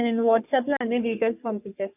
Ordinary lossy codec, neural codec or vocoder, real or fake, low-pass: none; codec, 44.1 kHz, 3.4 kbps, Pupu-Codec; fake; 3.6 kHz